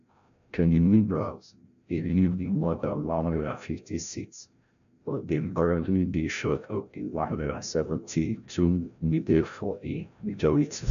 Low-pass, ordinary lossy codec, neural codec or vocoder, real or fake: 7.2 kHz; none; codec, 16 kHz, 0.5 kbps, FreqCodec, larger model; fake